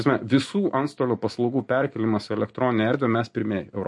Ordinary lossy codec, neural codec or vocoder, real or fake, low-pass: MP3, 48 kbps; none; real; 10.8 kHz